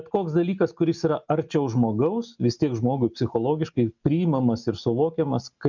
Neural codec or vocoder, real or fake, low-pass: none; real; 7.2 kHz